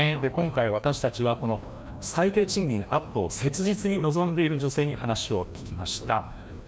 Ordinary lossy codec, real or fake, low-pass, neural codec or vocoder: none; fake; none; codec, 16 kHz, 1 kbps, FreqCodec, larger model